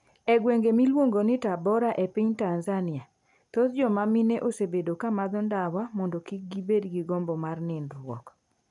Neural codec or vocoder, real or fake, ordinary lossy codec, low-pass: none; real; none; 10.8 kHz